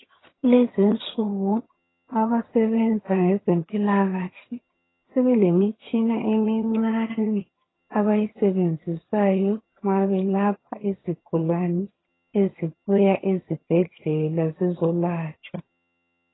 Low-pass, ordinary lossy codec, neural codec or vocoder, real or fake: 7.2 kHz; AAC, 16 kbps; vocoder, 22.05 kHz, 80 mel bands, HiFi-GAN; fake